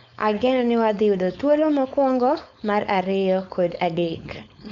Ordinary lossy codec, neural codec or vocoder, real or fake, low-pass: none; codec, 16 kHz, 4.8 kbps, FACodec; fake; 7.2 kHz